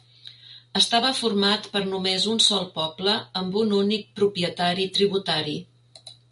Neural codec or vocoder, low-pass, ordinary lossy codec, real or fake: none; 10.8 kHz; MP3, 48 kbps; real